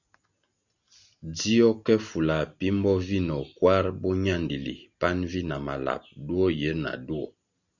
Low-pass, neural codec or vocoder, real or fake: 7.2 kHz; none; real